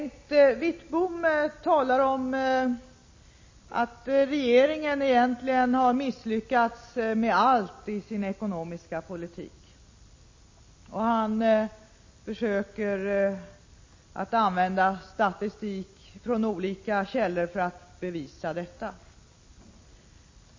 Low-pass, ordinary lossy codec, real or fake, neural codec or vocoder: 7.2 kHz; MP3, 32 kbps; real; none